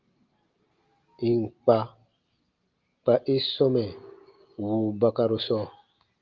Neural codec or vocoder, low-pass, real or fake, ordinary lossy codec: none; 7.2 kHz; real; Opus, 32 kbps